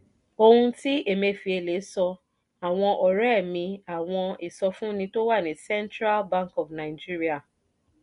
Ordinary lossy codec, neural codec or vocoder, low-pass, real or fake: none; none; 10.8 kHz; real